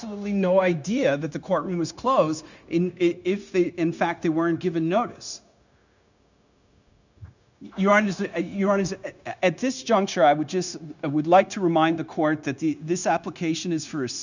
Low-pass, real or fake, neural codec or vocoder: 7.2 kHz; fake; codec, 16 kHz, 0.9 kbps, LongCat-Audio-Codec